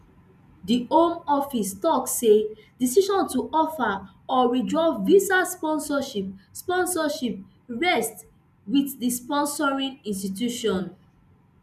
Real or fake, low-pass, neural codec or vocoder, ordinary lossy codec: real; 14.4 kHz; none; none